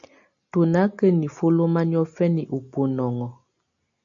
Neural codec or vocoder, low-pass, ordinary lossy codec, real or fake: none; 7.2 kHz; AAC, 64 kbps; real